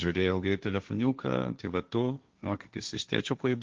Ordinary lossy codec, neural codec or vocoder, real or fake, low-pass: Opus, 24 kbps; codec, 16 kHz, 1.1 kbps, Voila-Tokenizer; fake; 7.2 kHz